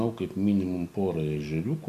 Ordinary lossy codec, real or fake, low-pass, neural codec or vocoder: MP3, 64 kbps; real; 14.4 kHz; none